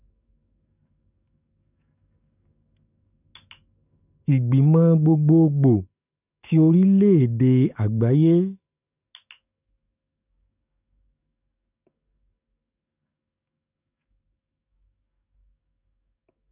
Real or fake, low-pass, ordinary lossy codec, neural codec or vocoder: fake; 3.6 kHz; none; codec, 44.1 kHz, 7.8 kbps, DAC